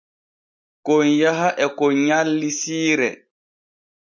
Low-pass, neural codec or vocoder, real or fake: 7.2 kHz; none; real